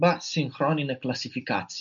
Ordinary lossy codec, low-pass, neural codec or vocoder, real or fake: MP3, 96 kbps; 7.2 kHz; none; real